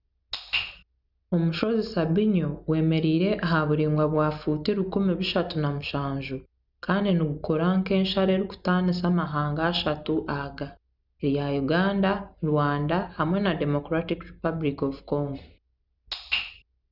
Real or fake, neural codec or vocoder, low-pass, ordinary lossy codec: real; none; 5.4 kHz; none